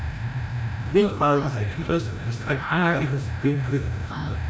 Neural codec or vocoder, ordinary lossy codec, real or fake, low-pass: codec, 16 kHz, 0.5 kbps, FreqCodec, larger model; none; fake; none